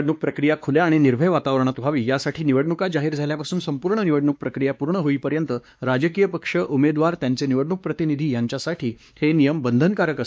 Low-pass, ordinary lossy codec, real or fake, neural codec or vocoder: none; none; fake; codec, 16 kHz, 2 kbps, X-Codec, WavLM features, trained on Multilingual LibriSpeech